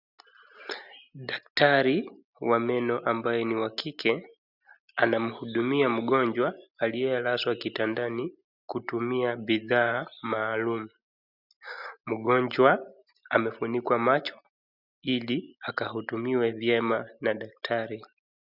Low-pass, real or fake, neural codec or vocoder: 5.4 kHz; real; none